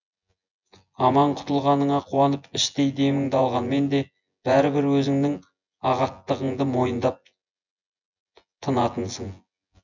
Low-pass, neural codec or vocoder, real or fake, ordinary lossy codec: 7.2 kHz; vocoder, 24 kHz, 100 mel bands, Vocos; fake; none